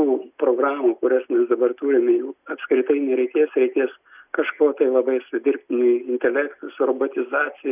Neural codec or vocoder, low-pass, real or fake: none; 3.6 kHz; real